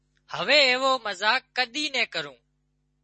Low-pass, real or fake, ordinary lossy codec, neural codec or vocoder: 10.8 kHz; real; MP3, 32 kbps; none